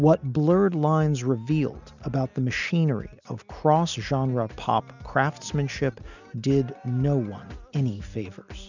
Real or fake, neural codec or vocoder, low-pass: real; none; 7.2 kHz